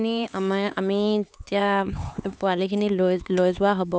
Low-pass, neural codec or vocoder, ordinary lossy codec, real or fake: none; codec, 16 kHz, 4 kbps, X-Codec, HuBERT features, trained on LibriSpeech; none; fake